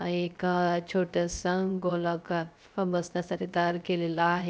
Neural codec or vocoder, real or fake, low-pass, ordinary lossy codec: codec, 16 kHz, 0.3 kbps, FocalCodec; fake; none; none